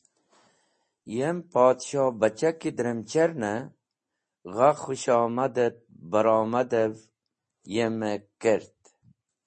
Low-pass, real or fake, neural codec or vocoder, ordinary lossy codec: 10.8 kHz; real; none; MP3, 32 kbps